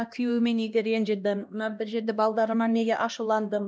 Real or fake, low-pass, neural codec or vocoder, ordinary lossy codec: fake; none; codec, 16 kHz, 1 kbps, X-Codec, HuBERT features, trained on LibriSpeech; none